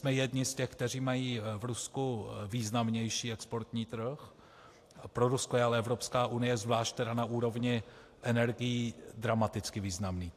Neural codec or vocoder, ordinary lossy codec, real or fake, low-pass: none; AAC, 64 kbps; real; 14.4 kHz